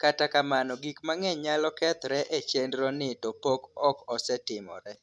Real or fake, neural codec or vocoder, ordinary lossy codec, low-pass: real; none; none; none